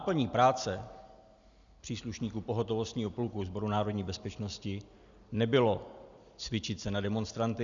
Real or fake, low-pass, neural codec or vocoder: real; 7.2 kHz; none